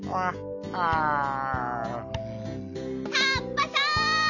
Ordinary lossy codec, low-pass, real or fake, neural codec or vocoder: none; 7.2 kHz; real; none